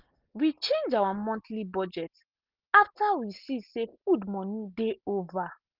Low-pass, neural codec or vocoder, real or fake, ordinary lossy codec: 5.4 kHz; none; real; Opus, 32 kbps